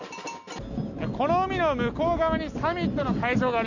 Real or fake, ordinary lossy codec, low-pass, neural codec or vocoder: real; none; 7.2 kHz; none